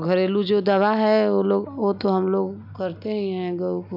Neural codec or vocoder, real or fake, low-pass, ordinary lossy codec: none; real; 5.4 kHz; none